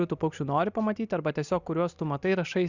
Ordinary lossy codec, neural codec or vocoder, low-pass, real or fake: Opus, 64 kbps; none; 7.2 kHz; real